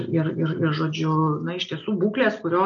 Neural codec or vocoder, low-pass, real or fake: none; 7.2 kHz; real